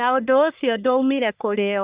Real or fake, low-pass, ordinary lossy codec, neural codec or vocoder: fake; 3.6 kHz; Opus, 64 kbps; codec, 44.1 kHz, 1.7 kbps, Pupu-Codec